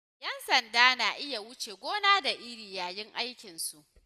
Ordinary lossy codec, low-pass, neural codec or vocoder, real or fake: none; 14.4 kHz; none; real